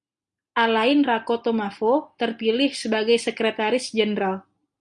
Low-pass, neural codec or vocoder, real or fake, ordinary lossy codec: 9.9 kHz; none; real; Opus, 64 kbps